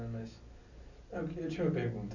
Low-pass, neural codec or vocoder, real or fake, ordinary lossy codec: 7.2 kHz; none; real; none